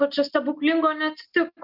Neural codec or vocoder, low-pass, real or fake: none; 5.4 kHz; real